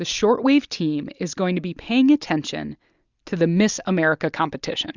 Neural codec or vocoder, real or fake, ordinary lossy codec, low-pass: none; real; Opus, 64 kbps; 7.2 kHz